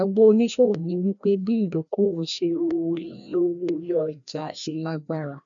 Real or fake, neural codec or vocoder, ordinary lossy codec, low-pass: fake; codec, 16 kHz, 1 kbps, FreqCodec, larger model; none; 7.2 kHz